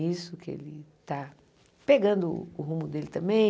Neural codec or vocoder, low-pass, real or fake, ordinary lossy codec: none; none; real; none